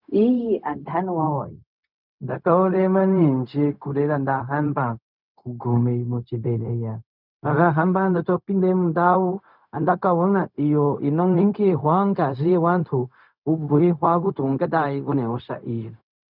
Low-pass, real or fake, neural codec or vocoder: 5.4 kHz; fake; codec, 16 kHz, 0.4 kbps, LongCat-Audio-Codec